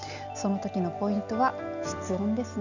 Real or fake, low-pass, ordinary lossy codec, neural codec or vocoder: real; 7.2 kHz; none; none